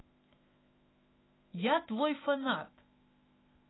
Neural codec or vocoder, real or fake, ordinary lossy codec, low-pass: autoencoder, 48 kHz, 128 numbers a frame, DAC-VAE, trained on Japanese speech; fake; AAC, 16 kbps; 7.2 kHz